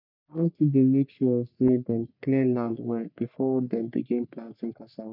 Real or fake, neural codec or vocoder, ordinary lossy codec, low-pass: fake; codec, 44.1 kHz, 3.4 kbps, Pupu-Codec; none; 5.4 kHz